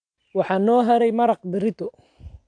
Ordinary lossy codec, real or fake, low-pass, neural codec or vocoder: AAC, 64 kbps; real; 9.9 kHz; none